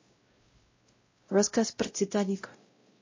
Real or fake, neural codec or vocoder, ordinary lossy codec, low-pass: fake; codec, 16 kHz, 0.5 kbps, X-Codec, WavLM features, trained on Multilingual LibriSpeech; MP3, 32 kbps; 7.2 kHz